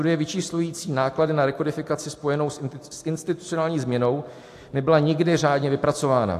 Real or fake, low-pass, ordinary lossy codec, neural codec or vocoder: real; 14.4 kHz; AAC, 64 kbps; none